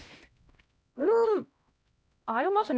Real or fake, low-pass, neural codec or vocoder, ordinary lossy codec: fake; none; codec, 16 kHz, 0.5 kbps, X-Codec, HuBERT features, trained on LibriSpeech; none